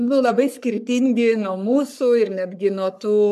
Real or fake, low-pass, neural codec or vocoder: fake; 14.4 kHz; codec, 44.1 kHz, 3.4 kbps, Pupu-Codec